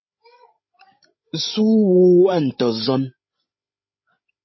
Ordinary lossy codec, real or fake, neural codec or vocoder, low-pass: MP3, 24 kbps; fake; codec, 16 kHz, 16 kbps, FreqCodec, larger model; 7.2 kHz